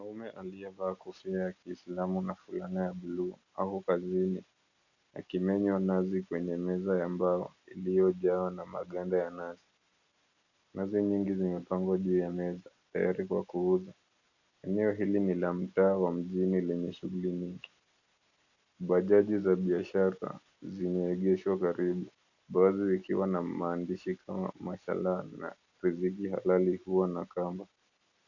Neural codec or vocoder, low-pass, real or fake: none; 7.2 kHz; real